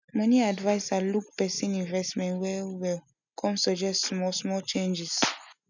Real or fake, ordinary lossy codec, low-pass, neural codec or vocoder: real; none; 7.2 kHz; none